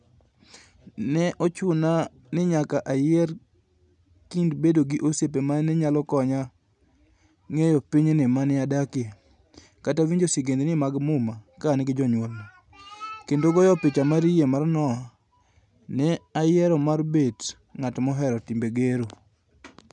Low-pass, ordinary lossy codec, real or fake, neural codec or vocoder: 10.8 kHz; none; real; none